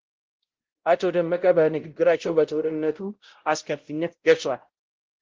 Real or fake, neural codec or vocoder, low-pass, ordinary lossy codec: fake; codec, 16 kHz, 0.5 kbps, X-Codec, WavLM features, trained on Multilingual LibriSpeech; 7.2 kHz; Opus, 16 kbps